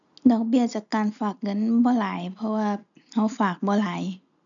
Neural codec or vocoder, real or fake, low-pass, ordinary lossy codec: none; real; 7.2 kHz; none